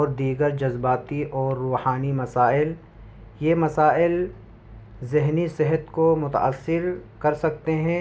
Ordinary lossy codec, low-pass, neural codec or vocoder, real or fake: none; none; none; real